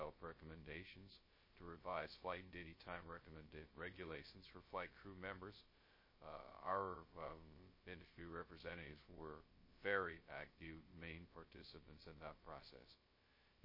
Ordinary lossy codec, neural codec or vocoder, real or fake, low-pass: MP3, 24 kbps; codec, 16 kHz, 0.2 kbps, FocalCodec; fake; 5.4 kHz